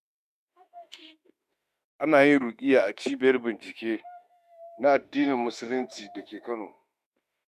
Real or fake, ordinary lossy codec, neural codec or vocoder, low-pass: fake; none; autoencoder, 48 kHz, 32 numbers a frame, DAC-VAE, trained on Japanese speech; 14.4 kHz